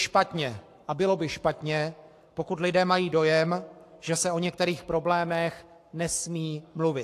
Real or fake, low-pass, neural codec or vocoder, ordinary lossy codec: fake; 14.4 kHz; codec, 44.1 kHz, 7.8 kbps, Pupu-Codec; AAC, 64 kbps